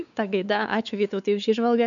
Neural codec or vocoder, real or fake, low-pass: codec, 16 kHz, 4 kbps, X-Codec, WavLM features, trained on Multilingual LibriSpeech; fake; 7.2 kHz